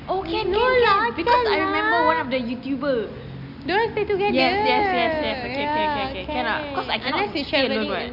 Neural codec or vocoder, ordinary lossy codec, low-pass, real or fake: none; none; 5.4 kHz; real